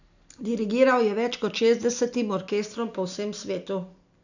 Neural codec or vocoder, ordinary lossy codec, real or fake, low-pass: none; none; real; 7.2 kHz